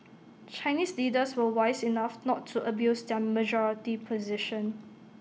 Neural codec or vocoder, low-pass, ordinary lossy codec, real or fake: none; none; none; real